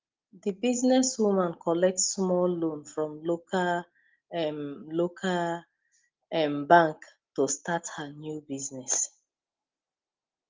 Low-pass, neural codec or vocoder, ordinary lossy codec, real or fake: 7.2 kHz; none; Opus, 32 kbps; real